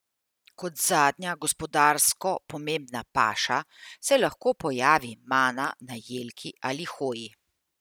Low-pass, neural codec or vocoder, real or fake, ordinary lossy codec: none; none; real; none